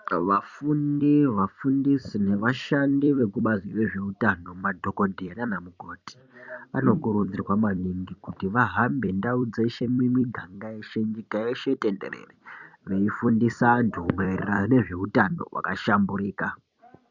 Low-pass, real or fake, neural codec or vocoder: 7.2 kHz; fake; vocoder, 44.1 kHz, 128 mel bands every 256 samples, BigVGAN v2